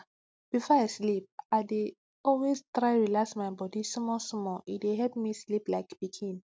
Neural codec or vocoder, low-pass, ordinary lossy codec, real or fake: none; none; none; real